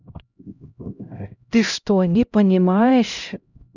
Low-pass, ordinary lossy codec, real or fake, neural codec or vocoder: 7.2 kHz; none; fake; codec, 16 kHz, 0.5 kbps, X-Codec, HuBERT features, trained on LibriSpeech